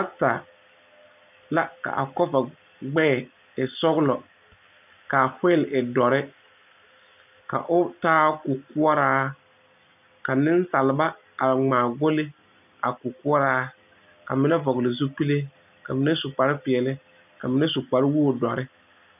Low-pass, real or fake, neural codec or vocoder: 3.6 kHz; real; none